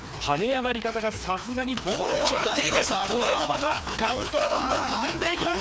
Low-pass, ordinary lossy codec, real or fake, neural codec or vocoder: none; none; fake; codec, 16 kHz, 2 kbps, FreqCodec, larger model